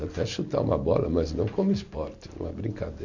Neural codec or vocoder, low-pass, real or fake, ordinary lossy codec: none; 7.2 kHz; real; none